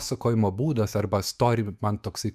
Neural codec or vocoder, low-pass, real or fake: autoencoder, 48 kHz, 128 numbers a frame, DAC-VAE, trained on Japanese speech; 14.4 kHz; fake